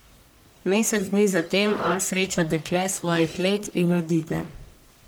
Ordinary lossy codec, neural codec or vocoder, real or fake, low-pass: none; codec, 44.1 kHz, 1.7 kbps, Pupu-Codec; fake; none